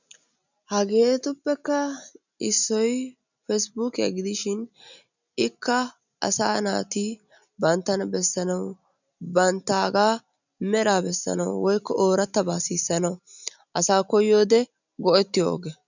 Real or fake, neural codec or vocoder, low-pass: real; none; 7.2 kHz